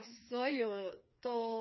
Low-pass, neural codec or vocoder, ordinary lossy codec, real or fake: 7.2 kHz; codec, 16 kHz, 2 kbps, FreqCodec, larger model; MP3, 24 kbps; fake